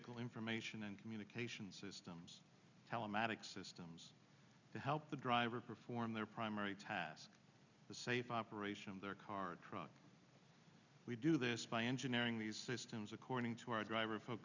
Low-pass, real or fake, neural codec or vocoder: 7.2 kHz; real; none